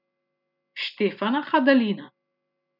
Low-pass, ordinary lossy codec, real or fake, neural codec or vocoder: 5.4 kHz; none; real; none